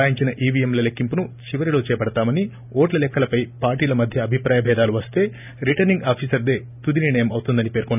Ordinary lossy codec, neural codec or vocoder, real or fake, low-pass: none; none; real; 3.6 kHz